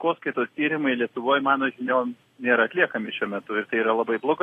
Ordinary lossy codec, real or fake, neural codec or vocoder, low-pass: AAC, 48 kbps; real; none; 14.4 kHz